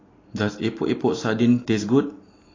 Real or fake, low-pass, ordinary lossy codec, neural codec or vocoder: real; 7.2 kHz; AAC, 32 kbps; none